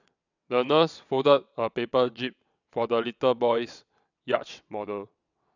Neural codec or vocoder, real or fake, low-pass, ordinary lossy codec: vocoder, 22.05 kHz, 80 mel bands, WaveNeXt; fake; 7.2 kHz; none